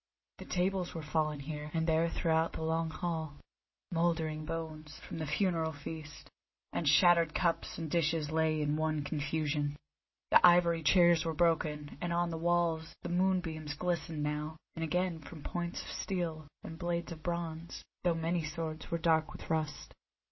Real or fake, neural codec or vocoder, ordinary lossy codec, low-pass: real; none; MP3, 24 kbps; 7.2 kHz